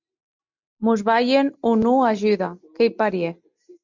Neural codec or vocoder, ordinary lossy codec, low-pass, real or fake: none; MP3, 64 kbps; 7.2 kHz; real